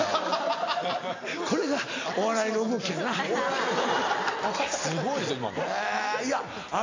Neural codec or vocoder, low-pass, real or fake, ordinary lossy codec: none; 7.2 kHz; real; AAC, 32 kbps